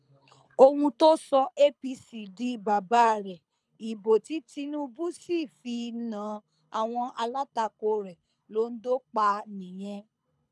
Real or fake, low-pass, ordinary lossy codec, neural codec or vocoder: fake; none; none; codec, 24 kHz, 6 kbps, HILCodec